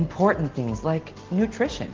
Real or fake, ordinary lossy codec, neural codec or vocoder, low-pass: real; Opus, 16 kbps; none; 7.2 kHz